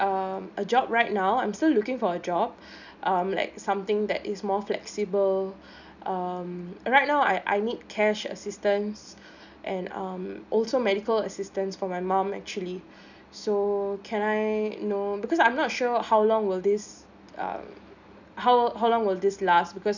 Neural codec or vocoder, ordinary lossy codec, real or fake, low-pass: none; none; real; 7.2 kHz